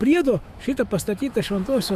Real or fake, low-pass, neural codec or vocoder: fake; 14.4 kHz; autoencoder, 48 kHz, 128 numbers a frame, DAC-VAE, trained on Japanese speech